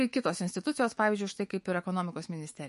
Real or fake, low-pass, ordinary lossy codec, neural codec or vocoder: fake; 14.4 kHz; MP3, 48 kbps; autoencoder, 48 kHz, 128 numbers a frame, DAC-VAE, trained on Japanese speech